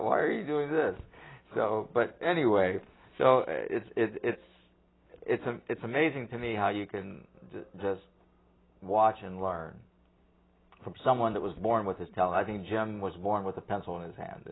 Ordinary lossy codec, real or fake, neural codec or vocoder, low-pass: AAC, 16 kbps; real; none; 7.2 kHz